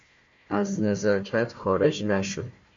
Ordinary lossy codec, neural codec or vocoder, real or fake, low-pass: MP3, 48 kbps; codec, 16 kHz, 1 kbps, FunCodec, trained on Chinese and English, 50 frames a second; fake; 7.2 kHz